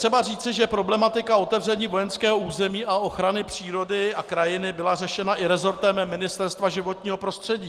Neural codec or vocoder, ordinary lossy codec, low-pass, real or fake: none; Opus, 24 kbps; 14.4 kHz; real